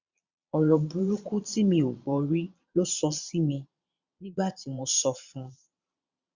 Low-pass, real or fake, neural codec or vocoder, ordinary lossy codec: 7.2 kHz; fake; vocoder, 22.05 kHz, 80 mel bands, Vocos; Opus, 64 kbps